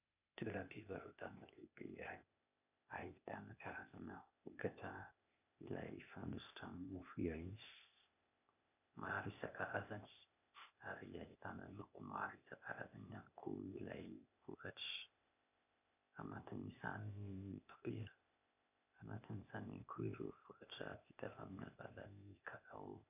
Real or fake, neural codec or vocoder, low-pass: fake; codec, 16 kHz, 0.8 kbps, ZipCodec; 3.6 kHz